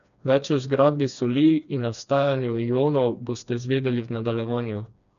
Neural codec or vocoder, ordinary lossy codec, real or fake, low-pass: codec, 16 kHz, 2 kbps, FreqCodec, smaller model; none; fake; 7.2 kHz